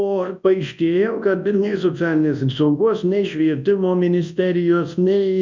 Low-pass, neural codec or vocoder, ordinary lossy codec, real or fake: 7.2 kHz; codec, 24 kHz, 0.9 kbps, WavTokenizer, large speech release; MP3, 64 kbps; fake